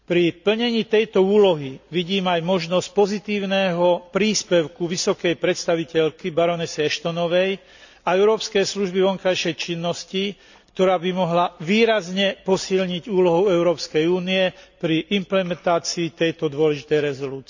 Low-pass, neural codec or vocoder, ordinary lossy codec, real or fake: 7.2 kHz; none; none; real